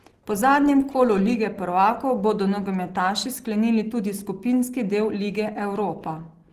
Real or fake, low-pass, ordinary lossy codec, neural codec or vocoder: real; 14.4 kHz; Opus, 16 kbps; none